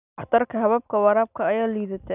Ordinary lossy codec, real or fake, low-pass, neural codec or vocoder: none; real; 3.6 kHz; none